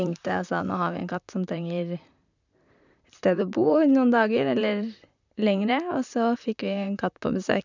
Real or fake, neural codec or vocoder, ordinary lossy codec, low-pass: fake; vocoder, 44.1 kHz, 128 mel bands, Pupu-Vocoder; none; 7.2 kHz